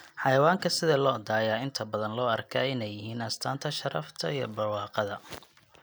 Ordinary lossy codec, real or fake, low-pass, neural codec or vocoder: none; real; none; none